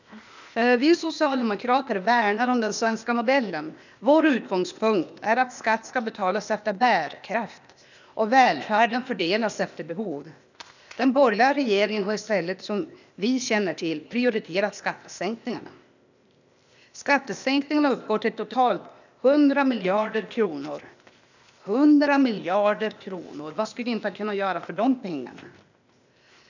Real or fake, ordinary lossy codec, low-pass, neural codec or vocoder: fake; none; 7.2 kHz; codec, 16 kHz, 0.8 kbps, ZipCodec